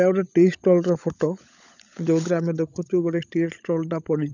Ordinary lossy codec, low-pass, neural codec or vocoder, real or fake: none; 7.2 kHz; codec, 16 kHz, 16 kbps, FunCodec, trained on LibriTTS, 50 frames a second; fake